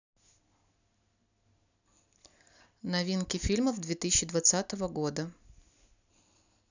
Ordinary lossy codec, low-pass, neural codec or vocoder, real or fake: none; 7.2 kHz; none; real